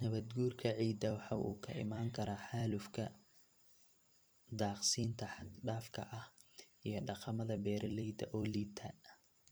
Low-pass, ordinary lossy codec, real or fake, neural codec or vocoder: none; none; fake; vocoder, 44.1 kHz, 128 mel bands every 256 samples, BigVGAN v2